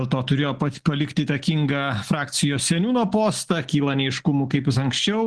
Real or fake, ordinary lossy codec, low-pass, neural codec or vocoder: real; Opus, 16 kbps; 10.8 kHz; none